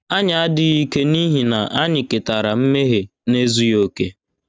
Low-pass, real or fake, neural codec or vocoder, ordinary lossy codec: none; real; none; none